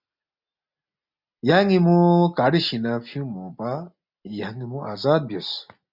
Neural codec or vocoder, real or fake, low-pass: none; real; 5.4 kHz